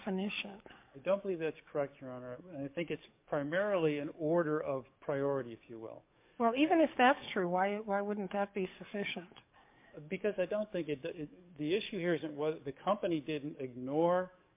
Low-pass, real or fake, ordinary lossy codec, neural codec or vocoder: 3.6 kHz; fake; MP3, 32 kbps; codec, 44.1 kHz, 7.8 kbps, DAC